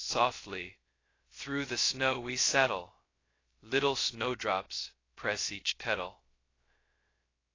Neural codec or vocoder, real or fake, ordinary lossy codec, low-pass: codec, 16 kHz, 0.2 kbps, FocalCodec; fake; AAC, 32 kbps; 7.2 kHz